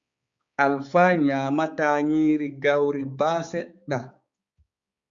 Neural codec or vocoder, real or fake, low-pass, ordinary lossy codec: codec, 16 kHz, 4 kbps, X-Codec, HuBERT features, trained on balanced general audio; fake; 7.2 kHz; Opus, 64 kbps